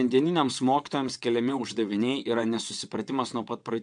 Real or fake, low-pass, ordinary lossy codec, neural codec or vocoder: fake; 9.9 kHz; MP3, 64 kbps; vocoder, 22.05 kHz, 80 mel bands, WaveNeXt